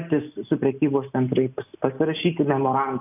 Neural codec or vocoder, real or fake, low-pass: none; real; 3.6 kHz